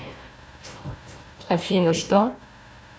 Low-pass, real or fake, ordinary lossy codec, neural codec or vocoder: none; fake; none; codec, 16 kHz, 1 kbps, FunCodec, trained on Chinese and English, 50 frames a second